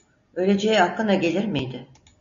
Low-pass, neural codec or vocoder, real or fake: 7.2 kHz; none; real